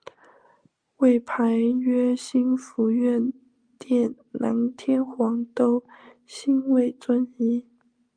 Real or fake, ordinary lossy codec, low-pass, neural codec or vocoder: real; Opus, 32 kbps; 9.9 kHz; none